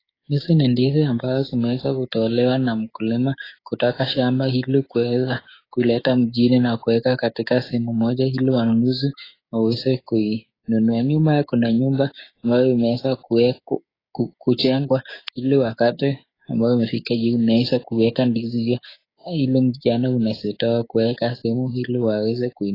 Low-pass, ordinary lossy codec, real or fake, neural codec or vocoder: 5.4 kHz; AAC, 24 kbps; fake; codec, 16 kHz, 6 kbps, DAC